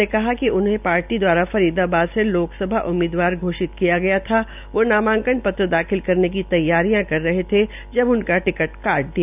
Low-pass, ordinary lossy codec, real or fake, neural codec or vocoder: 3.6 kHz; none; real; none